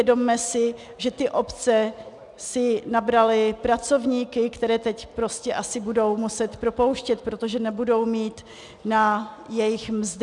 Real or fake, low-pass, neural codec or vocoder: real; 10.8 kHz; none